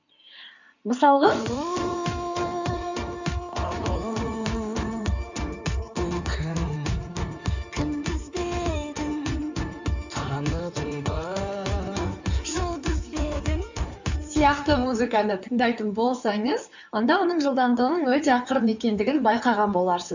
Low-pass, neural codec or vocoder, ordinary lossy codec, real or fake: 7.2 kHz; codec, 16 kHz in and 24 kHz out, 2.2 kbps, FireRedTTS-2 codec; none; fake